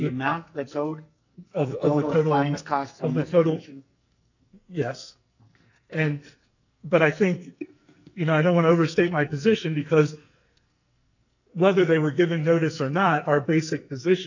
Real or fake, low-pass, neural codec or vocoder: fake; 7.2 kHz; codec, 44.1 kHz, 2.6 kbps, SNAC